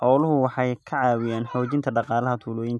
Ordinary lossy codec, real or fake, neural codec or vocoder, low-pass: none; real; none; none